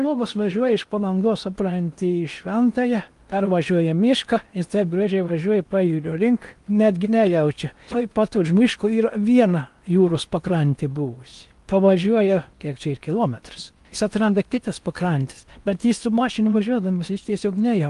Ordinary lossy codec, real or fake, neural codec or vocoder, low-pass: Opus, 32 kbps; fake; codec, 16 kHz in and 24 kHz out, 0.8 kbps, FocalCodec, streaming, 65536 codes; 10.8 kHz